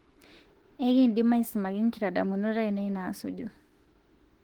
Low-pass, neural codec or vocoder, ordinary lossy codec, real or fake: 19.8 kHz; autoencoder, 48 kHz, 32 numbers a frame, DAC-VAE, trained on Japanese speech; Opus, 16 kbps; fake